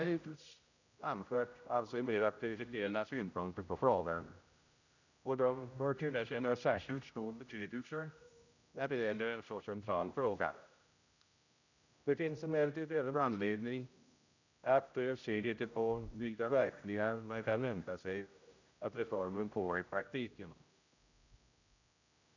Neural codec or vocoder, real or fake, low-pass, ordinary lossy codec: codec, 16 kHz, 0.5 kbps, X-Codec, HuBERT features, trained on general audio; fake; 7.2 kHz; none